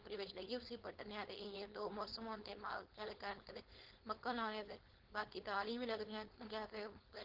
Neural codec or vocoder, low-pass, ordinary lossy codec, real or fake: codec, 16 kHz, 4.8 kbps, FACodec; 5.4 kHz; Opus, 16 kbps; fake